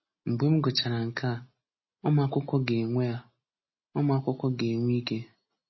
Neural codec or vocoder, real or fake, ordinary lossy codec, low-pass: none; real; MP3, 24 kbps; 7.2 kHz